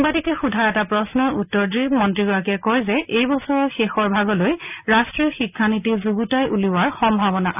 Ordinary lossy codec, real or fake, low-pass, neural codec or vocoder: none; real; 3.6 kHz; none